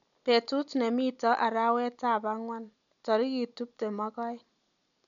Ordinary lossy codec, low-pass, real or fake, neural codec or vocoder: none; 7.2 kHz; real; none